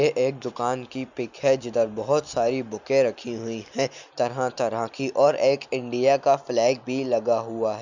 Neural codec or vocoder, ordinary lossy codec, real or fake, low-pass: none; none; real; 7.2 kHz